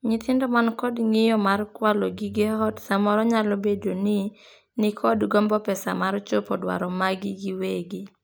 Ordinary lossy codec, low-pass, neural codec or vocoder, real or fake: none; none; none; real